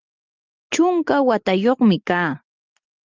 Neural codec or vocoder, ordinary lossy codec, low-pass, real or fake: none; Opus, 24 kbps; 7.2 kHz; real